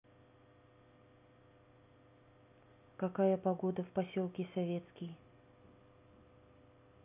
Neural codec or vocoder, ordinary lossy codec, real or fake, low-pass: none; none; real; 3.6 kHz